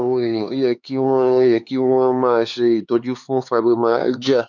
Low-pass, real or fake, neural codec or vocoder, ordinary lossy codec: 7.2 kHz; fake; codec, 16 kHz, 4 kbps, X-Codec, HuBERT features, trained on LibriSpeech; none